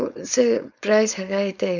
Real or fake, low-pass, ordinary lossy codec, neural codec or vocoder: fake; 7.2 kHz; none; codec, 16 kHz, 4.8 kbps, FACodec